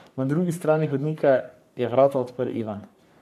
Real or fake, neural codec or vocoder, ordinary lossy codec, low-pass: fake; codec, 44.1 kHz, 3.4 kbps, Pupu-Codec; AAC, 96 kbps; 14.4 kHz